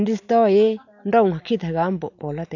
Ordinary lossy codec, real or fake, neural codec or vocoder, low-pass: none; real; none; 7.2 kHz